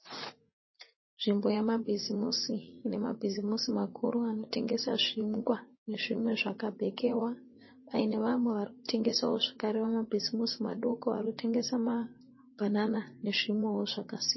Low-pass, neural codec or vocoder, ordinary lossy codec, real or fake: 7.2 kHz; vocoder, 44.1 kHz, 80 mel bands, Vocos; MP3, 24 kbps; fake